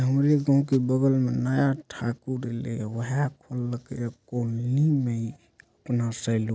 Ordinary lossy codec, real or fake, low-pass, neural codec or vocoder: none; real; none; none